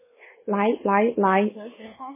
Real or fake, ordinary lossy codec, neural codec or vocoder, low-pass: fake; MP3, 16 kbps; codec, 24 kHz, 1.2 kbps, DualCodec; 3.6 kHz